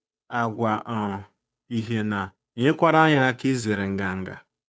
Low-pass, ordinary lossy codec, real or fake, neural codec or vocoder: none; none; fake; codec, 16 kHz, 2 kbps, FunCodec, trained on Chinese and English, 25 frames a second